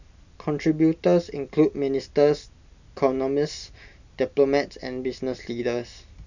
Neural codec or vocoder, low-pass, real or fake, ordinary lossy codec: none; 7.2 kHz; real; none